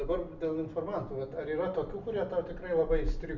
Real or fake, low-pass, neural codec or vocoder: real; 7.2 kHz; none